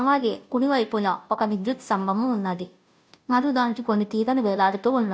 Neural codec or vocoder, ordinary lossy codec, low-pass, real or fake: codec, 16 kHz, 0.5 kbps, FunCodec, trained on Chinese and English, 25 frames a second; none; none; fake